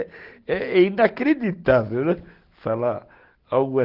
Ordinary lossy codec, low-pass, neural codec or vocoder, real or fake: Opus, 16 kbps; 5.4 kHz; none; real